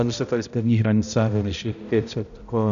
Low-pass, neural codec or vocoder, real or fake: 7.2 kHz; codec, 16 kHz, 0.5 kbps, X-Codec, HuBERT features, trained on balanced general audio; fake